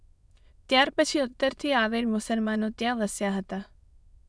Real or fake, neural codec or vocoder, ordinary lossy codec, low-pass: fake; autoencoder, 22.05 kHz, a latent of 192 numbers a frame, VITS, trained on many speakers; none; none